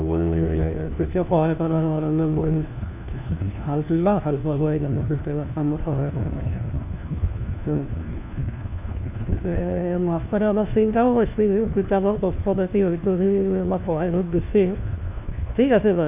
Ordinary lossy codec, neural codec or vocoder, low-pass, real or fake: none; codec, 16 kHz, 1 kbps, FunCodec, trained on LibriTTS, 50 frames a second; 3.6 kHz; fake